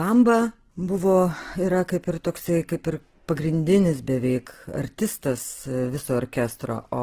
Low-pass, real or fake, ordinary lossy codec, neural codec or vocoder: 14.4 kHz; real; Opus, 16 kbps; none